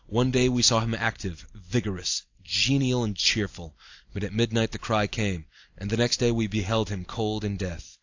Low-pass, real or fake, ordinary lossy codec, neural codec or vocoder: 7.2 kHz; real; AAC, 48 kbps; none